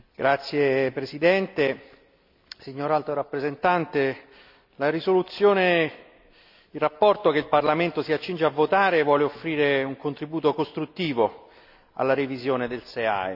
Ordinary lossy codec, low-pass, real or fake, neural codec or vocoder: none; 5.4 kHz; real; none